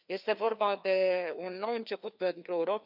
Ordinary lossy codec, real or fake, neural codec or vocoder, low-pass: none; fake; codec, 16 kHz, 2 kbps, FreqCodec, larger model; 5.4 kHz